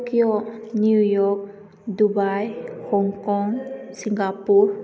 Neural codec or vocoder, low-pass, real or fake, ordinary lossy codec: none; none; real; none